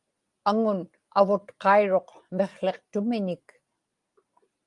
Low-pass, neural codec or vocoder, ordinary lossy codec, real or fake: 10.8 kHz; none; Opus, 32 kbps; real